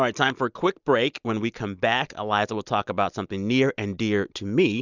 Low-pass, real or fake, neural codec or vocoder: 7.2 kHz; real; none